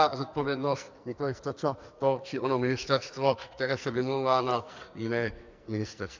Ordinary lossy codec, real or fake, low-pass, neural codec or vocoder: MP3, 64 kbps; fake; 7.2 kHz; codec, 44.1 kHz, 2.6 kbps, SNAC